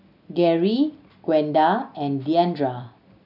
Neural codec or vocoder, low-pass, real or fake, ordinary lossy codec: none; 5.4 kHz; real; none